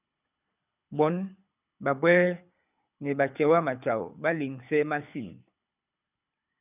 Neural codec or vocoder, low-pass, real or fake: codec, 24 kHz, 6 kbps, HILCodec; 3.6 kHz; fake